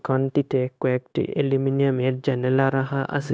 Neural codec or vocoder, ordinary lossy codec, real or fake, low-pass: codec, 16 kHz, 0.9 kbps, LongCat-Audio-Codec; none; fake; none